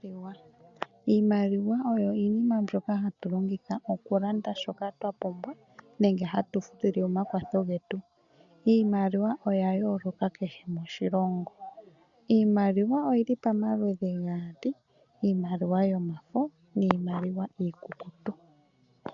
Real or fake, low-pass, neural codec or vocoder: real; 7.2 kHz; none